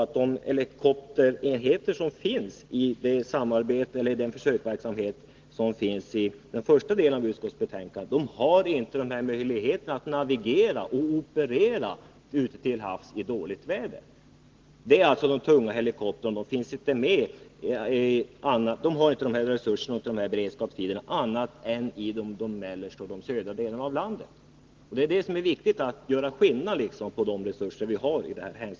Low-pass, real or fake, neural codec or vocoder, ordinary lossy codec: 7.2 kHz; real; none; Opus, 16 kbps